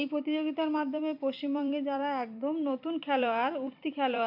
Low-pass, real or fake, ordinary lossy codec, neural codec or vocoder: 5.4 kHz; fake; none; vocoder, 44.1 kHz, 128 mel bands every 256 samples, BigVGAN v2